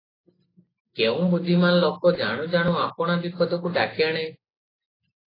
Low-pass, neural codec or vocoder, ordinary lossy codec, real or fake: 5.4 kHz; none; AAC, 24 kbps; real